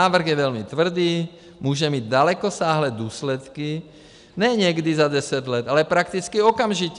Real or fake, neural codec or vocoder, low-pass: real; none; 10.8 kHz